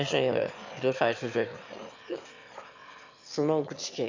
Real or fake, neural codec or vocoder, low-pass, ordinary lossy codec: fake; autoencoder, 22.05 kHz, a latent of 192 numbers a frame, VITS, trained on one speaker; 7.2 kHz; MP3, 64 kbps